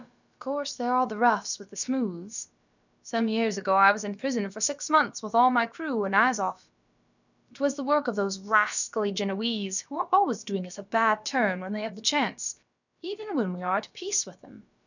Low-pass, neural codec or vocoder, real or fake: 7.2 kHz; codec, 16 kHz, about 1 kbps, DyCAST, with the encoder's durations; fake